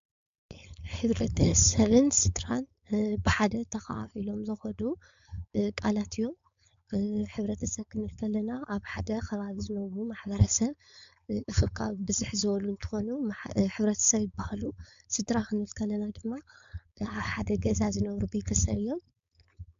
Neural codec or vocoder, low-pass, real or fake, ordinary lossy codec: codec, 16 kHz, 4.8 kbps, FACodec; 7.2 kHz; fake; MP3, 64 kbps